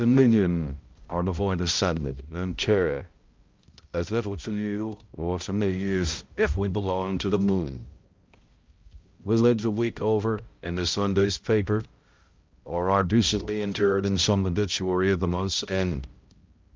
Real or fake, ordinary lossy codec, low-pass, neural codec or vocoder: fake; Opus, 24 kbps; 7.2 kHz; codec, 16 kHz, 0.5 kbps, X-Codec, HuBERT features, trained on balanced general audio